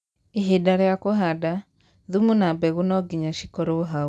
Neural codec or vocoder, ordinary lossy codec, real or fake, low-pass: none; none; real; none